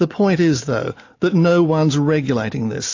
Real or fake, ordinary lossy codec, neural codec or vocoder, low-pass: real; AAC, 48 kbps; none; 7.2 kHz